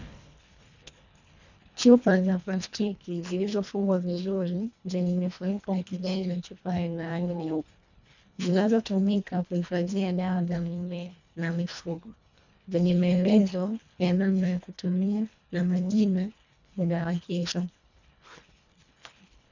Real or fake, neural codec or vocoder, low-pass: fake; codec, 24 kHz, 1.5 kbps, HILCodec; 7.2 kHz